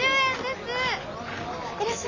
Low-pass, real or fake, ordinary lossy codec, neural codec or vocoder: 7.2 kHz; real; none; none